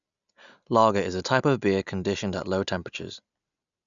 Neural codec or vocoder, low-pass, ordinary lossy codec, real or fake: none; 7.2 kHz; none; real